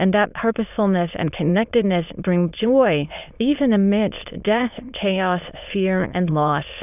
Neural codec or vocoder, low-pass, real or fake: autoencoder, 22.05 kHz, a latent of 192 numbers a frame, VITS, trained on many speakers; 3.6 kHz; fake